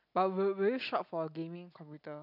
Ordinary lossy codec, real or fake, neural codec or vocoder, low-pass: none; real; none; 5.4 kHz